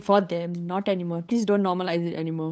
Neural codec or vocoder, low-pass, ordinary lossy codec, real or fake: codec, 16 kHz, 8 kbps, FunCodec, trained on LibriTTS, 25 frames a second; none; none; fake